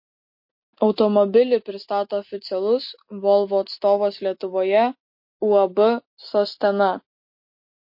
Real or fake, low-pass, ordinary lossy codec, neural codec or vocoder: real; 5.4 kHz; MP3, 32 kbps; none